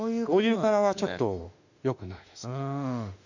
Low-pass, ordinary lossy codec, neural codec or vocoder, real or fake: 7.2 kHz; none; autoencoder, 48 kHz, 32 numbers a frame, DAC-VAE, trained on Japanese speech; fake